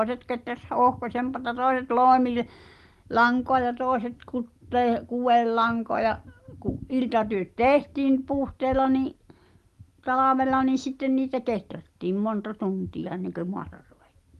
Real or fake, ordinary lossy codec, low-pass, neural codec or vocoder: real; Opus, 24 kbps; 14.4 kHz; none